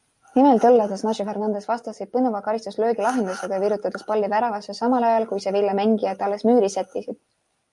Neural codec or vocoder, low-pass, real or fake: none; 10.8 kHz; real